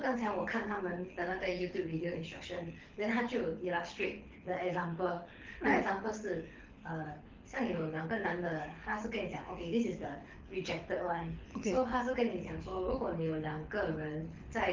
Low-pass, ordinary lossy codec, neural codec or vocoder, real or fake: 7.2 kHz; Opus, 32 kbps; codec, 24 kHz, 6 kbps, HILCodec; fake